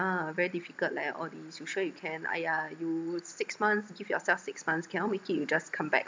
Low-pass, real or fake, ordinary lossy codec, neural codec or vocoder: none; real; none; none